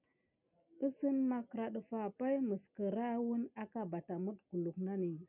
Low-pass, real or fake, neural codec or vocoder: 3.6 kHz; real; none